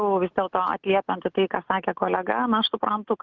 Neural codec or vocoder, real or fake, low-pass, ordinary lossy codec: none; real; 7.2 kHz; Opus, 32 kbps